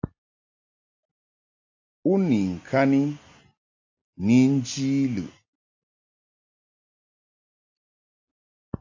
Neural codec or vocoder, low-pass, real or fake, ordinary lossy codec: none; 7.2 kHz; real; AAC, 48 kbps